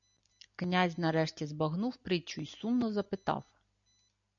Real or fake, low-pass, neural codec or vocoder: real; 7.2 kHz; none